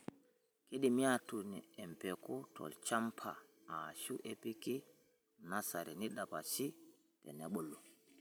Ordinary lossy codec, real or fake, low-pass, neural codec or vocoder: none; fake; none; vocoder, 44.1 kHz, 128 mel bands every 256 samples, BigVGAN v2